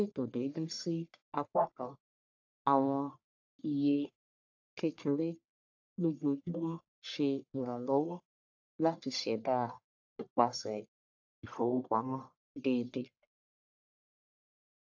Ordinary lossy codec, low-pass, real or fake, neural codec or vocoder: AAC, 48 kbps; 7.2 kHz; fake; codec, 44.1 kHz, 1.7 kbps, Pupu-Codec